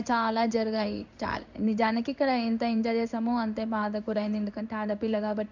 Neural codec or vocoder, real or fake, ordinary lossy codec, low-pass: codec, 16 kHz in and 24 kHz out, 1 kbps, XY-Tokenizer; fake; none; 7.2 kHz